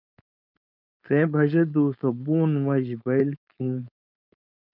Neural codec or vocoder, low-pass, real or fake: codec, 16 kHz, 4.8 kbps, FACodec; 5.4 kHz; fake